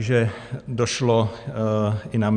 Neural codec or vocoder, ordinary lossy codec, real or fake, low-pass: none; Opus, 64 kbps; real; 9.9 kHz